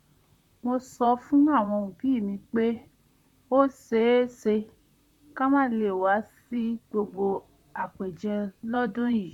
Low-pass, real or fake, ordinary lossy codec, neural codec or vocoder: 19.8 kHz; fake; none; vocoder, 44.1 kHz, 128 mel bands, Pupu-Vocoder